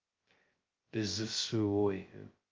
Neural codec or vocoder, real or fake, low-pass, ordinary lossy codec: codec, 16 kHz, 0.2 kbps, FocalCodec; fake; 7.2 kHz; Opus, 32 kbps